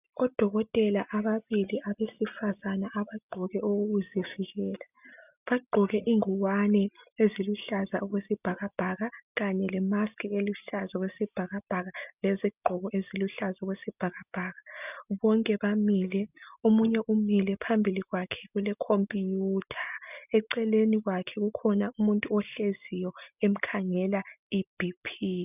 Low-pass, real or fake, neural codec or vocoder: 3.6 kHz; real; none